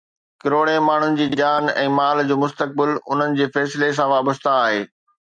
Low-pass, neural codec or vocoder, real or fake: 9.9 kHz; none; real